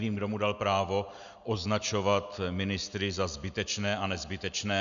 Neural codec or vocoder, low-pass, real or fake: none; 7.2 kHz; real